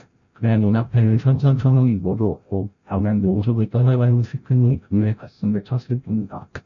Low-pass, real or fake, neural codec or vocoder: 7.2 kHz; fake; codec, 16 kHz, 0.5 kbps, FreqCodec, larger model